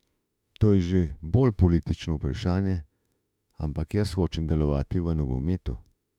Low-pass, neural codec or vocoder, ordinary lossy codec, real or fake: 19.8 kHz; autoencoder, 48 kHz, 32 numbers a frame, DAC-VAE, trained on Japanese speech; none; fake